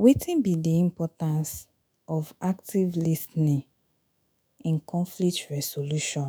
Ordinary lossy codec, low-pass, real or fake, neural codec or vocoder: none; none; fake; autoencoder, 48 kHz, 128 numbers a frame, DAC-VAE, trained on Japanese speech